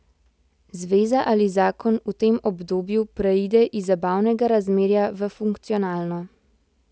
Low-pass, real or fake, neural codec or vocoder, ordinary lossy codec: none; real; none; none